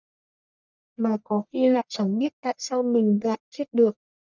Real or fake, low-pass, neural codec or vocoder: fake; 7.2 kHz; codec, 44.1 kHz, 1.7 kbps, Pupu-Codec